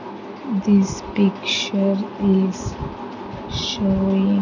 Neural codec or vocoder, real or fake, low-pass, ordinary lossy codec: none; real; 7.2 kHz; none